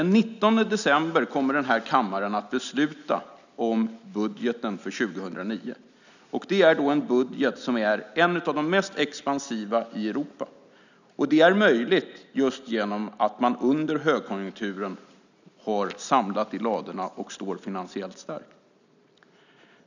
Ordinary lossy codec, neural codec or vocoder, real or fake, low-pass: none; none; real; 7.2 kHz